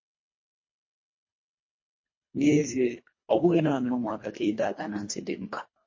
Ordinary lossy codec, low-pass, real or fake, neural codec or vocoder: MP3, 32 kbps; 7.2 kHz; fake; codec, 24 kHz, 1.5 kbps, HILCodec